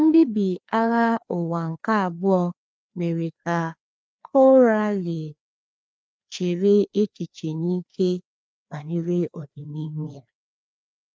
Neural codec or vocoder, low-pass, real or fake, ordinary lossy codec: codec, 16 kHz, 2 kbps, FreqCodec, larger model; none; fake; none